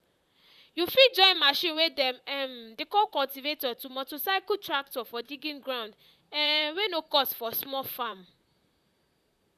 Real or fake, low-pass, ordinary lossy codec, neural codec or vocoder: fake; 14.4 kHz; none; vocoder, 44.1 kHz, 128 mel bands, Pupu-Vocoder